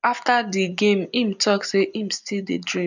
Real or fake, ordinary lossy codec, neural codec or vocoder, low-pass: real; none; none; 7.2 kHz